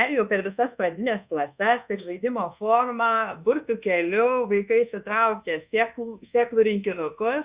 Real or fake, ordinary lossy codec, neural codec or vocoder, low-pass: fake; Opus, 64 kbps; codec, 24 kHz, 1.2 kbps, DualCodec; 3.6 kHz